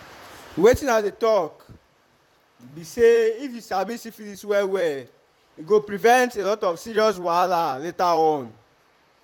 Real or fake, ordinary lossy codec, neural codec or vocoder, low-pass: fake; MP3, 96 kbps; vocoder, 44.1 kHz, 128 mel bands, Pupu-Vocoder; 19.8 kHz